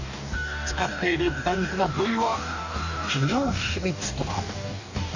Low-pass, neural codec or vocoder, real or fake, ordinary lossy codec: 7.2 kHz; codec, 44.1 kHz, 2.6 kbps, DAC; fake; none